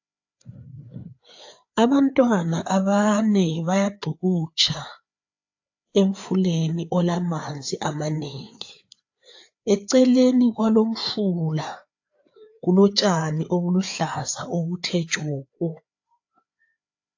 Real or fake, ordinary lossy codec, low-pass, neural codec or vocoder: fake; AAC, 48 kbps; 7.2 kHz; codec, 16 kHz, 4 kbps, FreqCodec, larger model